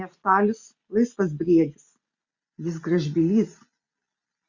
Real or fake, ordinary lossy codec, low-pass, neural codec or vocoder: real; Opus, 64 kbps; 7.2 kHz; none